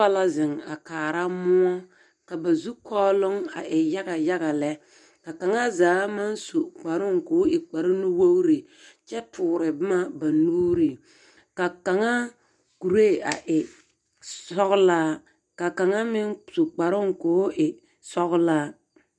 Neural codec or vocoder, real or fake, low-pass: none; real; 10.8 kHz